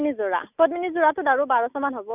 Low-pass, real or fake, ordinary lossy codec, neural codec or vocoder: 3.6 kHz; real; none; none